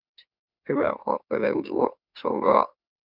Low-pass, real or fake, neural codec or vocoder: 5.4 kHz; fake; autoencoder, 44.1 kHz, a latent of 192 numbers a frame, MeloTTS